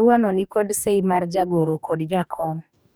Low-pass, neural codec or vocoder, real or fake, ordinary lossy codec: none; codec, 44.1 kHz, 2.6 kbps, DAC; fake; none